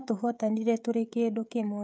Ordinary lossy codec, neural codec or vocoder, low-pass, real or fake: none; codec, 16 kHz, 16 kbps, FreqCodec, smaller model; none; fake